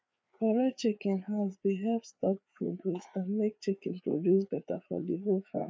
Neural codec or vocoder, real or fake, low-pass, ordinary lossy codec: codec, 16 kHz, 4 kbps, FreqCodec, larger model; fake; none; none